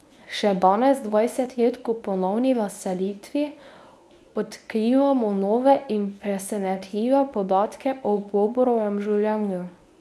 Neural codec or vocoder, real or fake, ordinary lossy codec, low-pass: codec, 24 kHz, 0.9 kbps, WavTokenizer, medium speech release version 1; fake; none; none